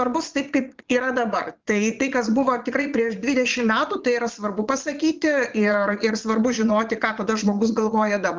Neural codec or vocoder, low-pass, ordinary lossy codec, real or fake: vocoder, 22.05 kHz, 80 mel bands, Vocos; 7.2 kHz; Opus, 24 kbps; fake